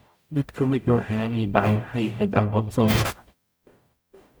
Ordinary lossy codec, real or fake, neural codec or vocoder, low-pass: none; fake; codec, 44.1 kHz, 0.9 kbps, DAC; none